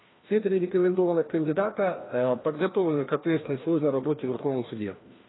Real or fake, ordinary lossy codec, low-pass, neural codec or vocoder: fake; AAC, 16 kbps; 7.2 kHz; codec, 16 kHz, 1 kbps, FreqCodec, larger model